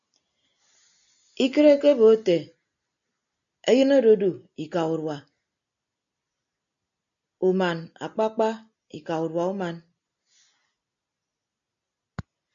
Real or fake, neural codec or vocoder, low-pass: real; none; 7.2 kHz